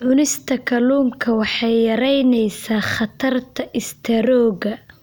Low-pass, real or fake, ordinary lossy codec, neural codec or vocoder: none; real; none; none